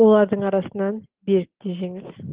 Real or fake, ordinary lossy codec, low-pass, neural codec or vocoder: real; Opus, 16 kbps; 3.6 kHz; none